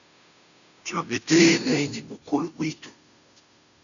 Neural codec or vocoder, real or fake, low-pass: codec, 16 kHz, 0.5 kbps, FunCodec, trained on Chinese and English, 25 frames a second; fake; 7.2 kHz